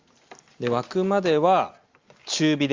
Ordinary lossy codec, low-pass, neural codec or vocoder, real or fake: Opus, 32 kbps; 7.2 kHz; none; real